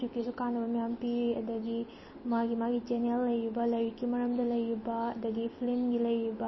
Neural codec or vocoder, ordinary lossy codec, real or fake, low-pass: none; MP3, 24 kbps; real; 7.2 kHz